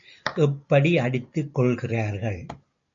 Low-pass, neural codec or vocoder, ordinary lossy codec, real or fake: 7.2 kHz; none; AAC, 64 kbps; real